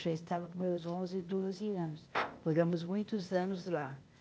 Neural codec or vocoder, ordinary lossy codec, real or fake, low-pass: codec, 16 kHz, 0.8 kbps, ZipCodec; none; fake; none